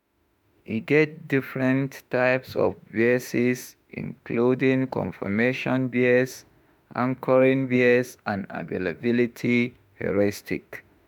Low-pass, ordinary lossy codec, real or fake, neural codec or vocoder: none; none; fake; autoencoder, 48 kHz, 32 numbers a frame, DAC-VAE, trained on Japanese speech